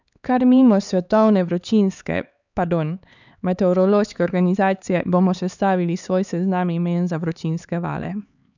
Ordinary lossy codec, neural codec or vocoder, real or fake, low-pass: none; codec, 16 kHz, 4 kbps, X-Codec, HuBERT features, trained on LibriSpeech; fake; 7.2 kHz